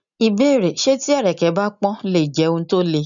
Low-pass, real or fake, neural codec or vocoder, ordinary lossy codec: 7.2 kHz; real; none; none